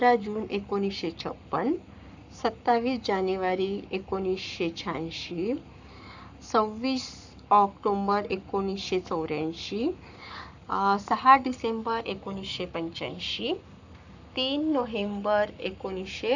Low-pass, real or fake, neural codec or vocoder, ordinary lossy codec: 7.2 kHz; fake; codec, 44.1 kHz, 7.8 kbps, Pupu-Codec; none